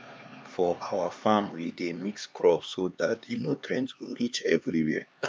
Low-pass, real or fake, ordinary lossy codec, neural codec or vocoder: none; fake; none; codec, 16 kHz, 2 kbps, X-Codec, HuBERT features, trained on LibriSpeech